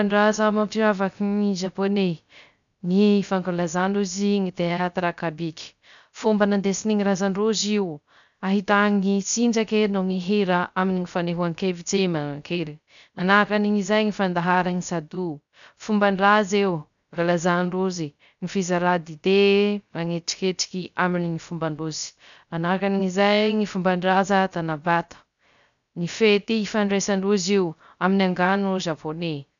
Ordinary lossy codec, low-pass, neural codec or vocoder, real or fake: none; 7.2 kHz; codec, 16 kHz, 0.3 kbps, FocalCodec; fake